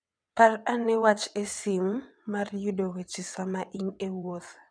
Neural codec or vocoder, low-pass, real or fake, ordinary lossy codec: vocoder, 22.05 kHz, 80 mel bands, WaveNeXt; 9.9 kHz; fake; none